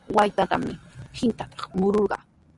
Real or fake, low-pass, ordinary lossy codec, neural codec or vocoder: real; 10.8 kHz; Opus, 64 kbps; none